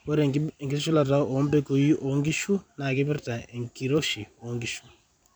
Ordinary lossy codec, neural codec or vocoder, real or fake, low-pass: none; vocoder, 44.1 kHz, 128 mel bands every 256 samples, BigVGAN v2; fake; none